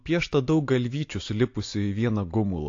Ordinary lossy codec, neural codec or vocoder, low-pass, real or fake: AAC, 48 kbps; none; 7.2 kHz; real